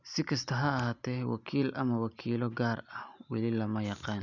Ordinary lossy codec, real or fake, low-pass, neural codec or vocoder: none; real; 7.2 kHz; none